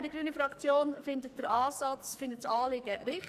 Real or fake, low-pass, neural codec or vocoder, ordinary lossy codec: fake; 14.4 kHz; codec, 44.1 kHz, 2.6 kbps, SNAC; none